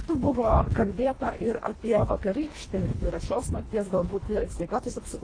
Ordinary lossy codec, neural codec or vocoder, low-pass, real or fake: AAC, 32 kbps; codec, 24 kHz, 1.5 kbps, HILCodec; 9.9 kHz; fake